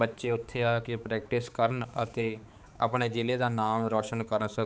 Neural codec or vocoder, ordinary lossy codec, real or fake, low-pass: codec, 16 kHz, 4 kbps, X-Codec, HuBERT features, trained on balanced general audio; none; fake; none